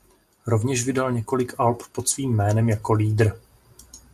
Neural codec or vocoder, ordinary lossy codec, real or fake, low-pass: none; MP3, 96 kbps; real; 14.4 kHz